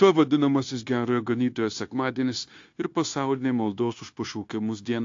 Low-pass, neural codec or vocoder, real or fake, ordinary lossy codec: 7.2 kHz; codec, 16 kHz, 0.9 kbps, LongCat-Audio-Codec; fake; AAC, 48 kbps